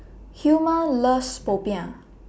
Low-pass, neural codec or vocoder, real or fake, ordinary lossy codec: none; none; real; none